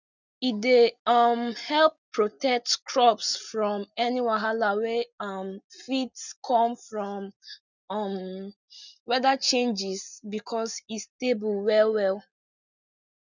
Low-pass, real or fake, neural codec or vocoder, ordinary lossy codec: 7.2 kHz; real; none; none